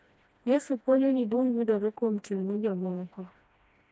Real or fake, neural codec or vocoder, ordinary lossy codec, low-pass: fake; codec, 16 kHz, 1 kbps, FreqCodec, smaller model; none; none